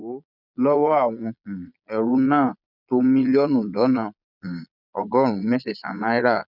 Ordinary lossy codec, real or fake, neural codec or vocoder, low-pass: none; fake; vocoder, 22.05 kHz, 80 mel bands, Vocos; 5.4 kHz